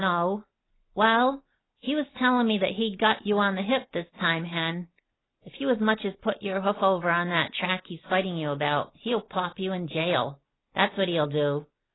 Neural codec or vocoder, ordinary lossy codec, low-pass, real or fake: none; AAC, 16 kbps; 7.2 kHz; real